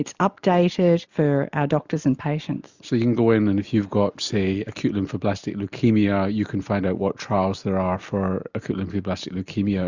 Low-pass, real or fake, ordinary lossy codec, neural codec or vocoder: 7.2 kHz; real; Opus, 64 kbps; none